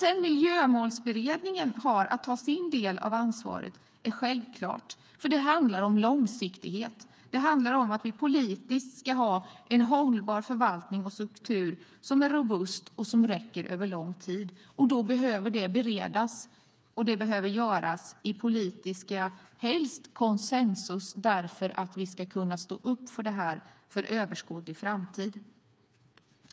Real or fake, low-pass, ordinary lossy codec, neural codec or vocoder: fake; none; none; codec, 16 kHz, 4 kbps, FreqCodec, smaller model